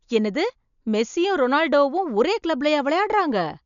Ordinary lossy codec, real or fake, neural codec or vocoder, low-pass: none; real; none; 7.2 kHz